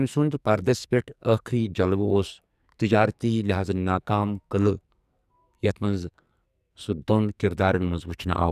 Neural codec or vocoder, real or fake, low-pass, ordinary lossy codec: codec, 44.1 kHz, 2.6 kbps, SNAC; fake; 14.4 kHz; none